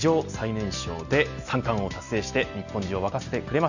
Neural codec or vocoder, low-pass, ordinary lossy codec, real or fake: none; 7.2 kHz; none; real